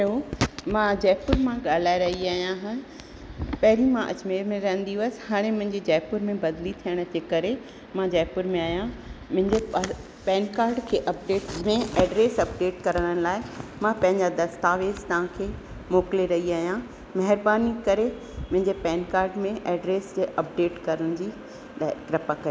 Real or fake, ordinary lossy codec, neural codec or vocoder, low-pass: real; none; none; none